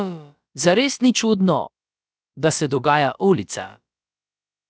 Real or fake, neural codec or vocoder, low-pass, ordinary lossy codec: fake; codec, 16 kHz, about 1 kbps, DyCAST, with the encoder's durations; none; none